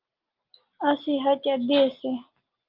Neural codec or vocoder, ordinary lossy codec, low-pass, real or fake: none; Opus, 32 kbps; 5.4 kHz; real